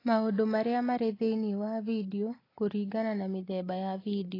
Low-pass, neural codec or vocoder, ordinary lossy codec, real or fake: 5.4 kHz; none; AAC, 24 kbps; real